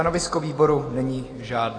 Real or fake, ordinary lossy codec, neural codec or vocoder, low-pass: real; AAC, 32 kbps; none; 9.9 kHz